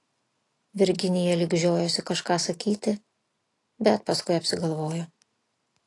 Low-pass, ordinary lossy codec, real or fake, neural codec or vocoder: 10.8 kHz; AAC, 48 kbps; real; none